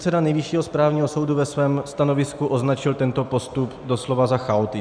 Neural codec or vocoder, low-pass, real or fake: none; 9.9 kHz; real